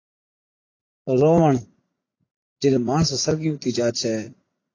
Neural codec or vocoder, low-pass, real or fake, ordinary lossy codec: vocoder, 44.1 kHz, 80 mel bands, Vocos; 7.2 kHz; fake; AAC, 32 kbps